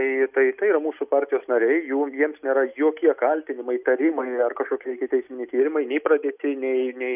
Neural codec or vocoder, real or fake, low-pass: none; real; 3.6 kHz